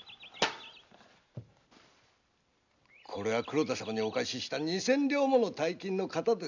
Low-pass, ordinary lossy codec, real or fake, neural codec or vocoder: 7.2 kHz; none; real; none